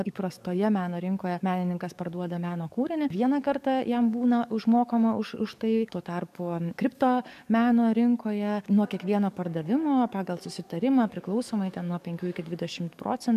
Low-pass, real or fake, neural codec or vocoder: 14.4 kHz; fake; codec, 44.1 kHz, 7.8 kbps, DAC